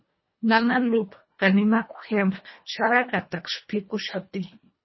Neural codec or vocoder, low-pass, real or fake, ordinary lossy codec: codec, 24 kHz, 1.5 kbps, HILCodec; 7.2 kHz; fake; MP3, 24 kbps